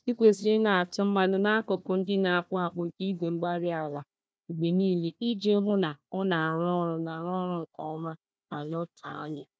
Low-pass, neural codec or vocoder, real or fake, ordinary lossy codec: none; codec, 16 kHz, 1 kbps, FunCodec, trained on Chinese and English, 50 frames a second; fake; none